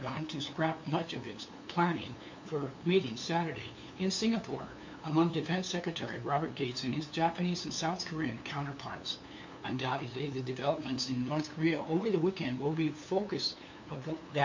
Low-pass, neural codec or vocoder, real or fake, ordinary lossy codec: 7.2 kHz; codec, 16 kHz, 2 kbps, FunCodec, trained on LibriTTS, 25 frames a second; fake; MP3, 48 kbps